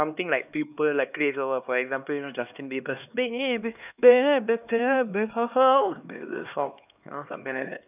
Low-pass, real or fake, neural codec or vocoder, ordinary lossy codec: 3.6 kHz; fake; codec, 16 kHz, 2 kbps, X-Codec, HuBERT features, trained on LibriSpeech; none